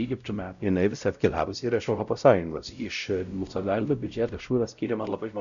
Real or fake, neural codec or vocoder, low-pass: fake; codec, 16 kHz, 0.5 kbps, X-Codec, WavLM features, trained on Multilingual LibriSpeech; 7.2 kHz